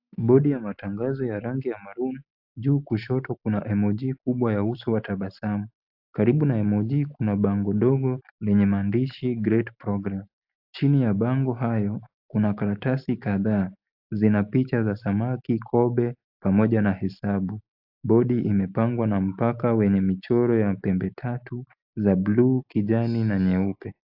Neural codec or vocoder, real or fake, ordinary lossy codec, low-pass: none; real; AAC, 48 kbps; 5.4 kHz